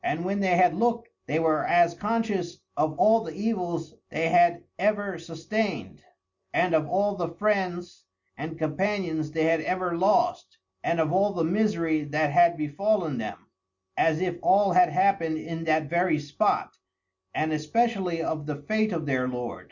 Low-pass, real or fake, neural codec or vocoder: 7.2 kHz; real; none